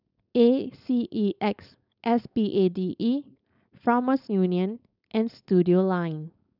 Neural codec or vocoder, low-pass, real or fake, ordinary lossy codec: codec, 16 kHz, 4.8 kbps, FACodec; 5.4 kHz; fake; none